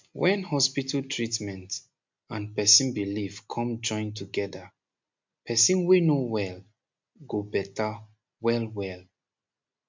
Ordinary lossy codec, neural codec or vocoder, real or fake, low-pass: MP3, 64 kbps; none; real; 7.2 kHz